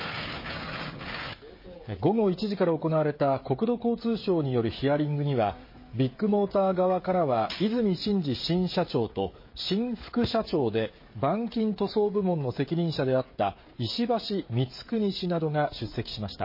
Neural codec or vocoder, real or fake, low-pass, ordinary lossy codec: codec, 16 kHz, 16 kbps, FreqCodec, smaller model; fake; 5.4 kHz; MP3, 24 kbps